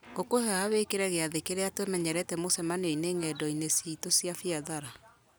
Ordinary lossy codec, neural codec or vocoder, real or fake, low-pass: none; none; real; none